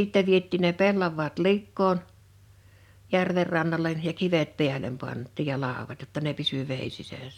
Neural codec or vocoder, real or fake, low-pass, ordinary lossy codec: vocoder, 44.1 kHz, 128 mel bands every 256 samples, BigVGAN v2; fake; 19.8 kHz; none